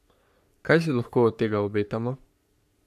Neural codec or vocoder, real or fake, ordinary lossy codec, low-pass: codec, 44.1 kHz, 3.4 kbps, Pupu-Codec; fake; none; 14.4 kHz